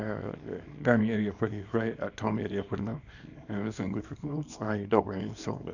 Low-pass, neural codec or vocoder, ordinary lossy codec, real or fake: 7.2 kHz; codec, 24 kHz, 0.9 kbps, WavTokenizer, small release; none; fake